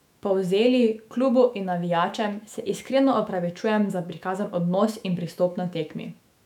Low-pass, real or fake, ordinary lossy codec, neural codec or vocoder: 19.8 kHz; fake; none; autoencoder, 48 kHz, 128 numbers a frame, DAC-VAE, trained on Japanese speech